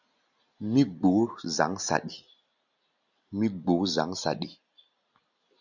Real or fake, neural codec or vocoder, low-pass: real; none; 7.2 kHz